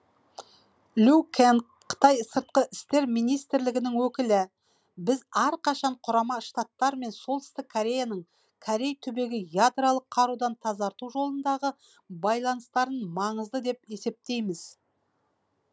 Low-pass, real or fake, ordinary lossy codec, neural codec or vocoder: none; real; none; none